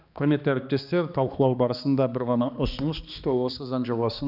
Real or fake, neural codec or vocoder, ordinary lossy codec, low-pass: fake; codec, 16 kHz, 2 kbps, X-Codec, HuBERT features, trained on balanced general audio; none; 5.4 kHz